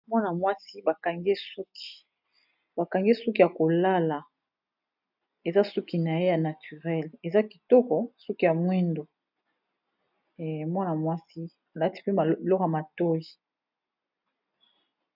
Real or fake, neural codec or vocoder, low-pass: real; none; 5.4 kHz